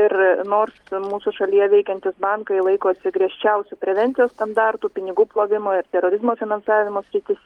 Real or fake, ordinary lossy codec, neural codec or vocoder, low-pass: real; Opus, 32 kbps; none; 19.8 kHz